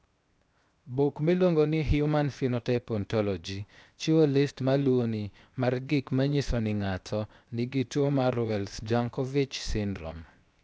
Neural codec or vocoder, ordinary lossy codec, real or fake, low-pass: codec, 16 kHz, 0.7 kbps, FocalCodec; none; fake; none